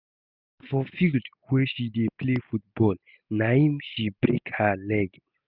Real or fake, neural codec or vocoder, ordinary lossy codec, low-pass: real; none; none; 5.4 kHz